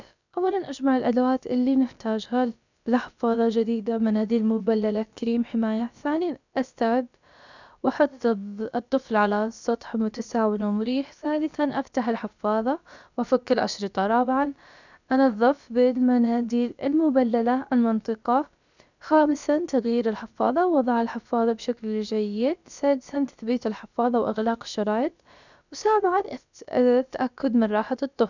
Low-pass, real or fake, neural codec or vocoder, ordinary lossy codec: 7.2 kHz; fake; codec, 16 kHz, about 1 kbps, DyCAST, with the encoder's durations; none